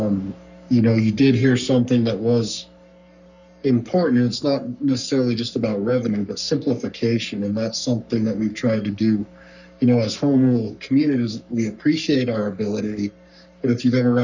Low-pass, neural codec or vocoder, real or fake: 7.2 kHz; codec, 44.1 kHz, 3.4 kbps, Pupu-Codec; fake